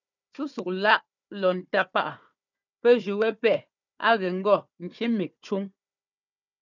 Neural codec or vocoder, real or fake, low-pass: codec, 16 kHz, 4 kbps, FunCodec, trained on Chinese and English, 50 frames a second; fake; 7.2 kHz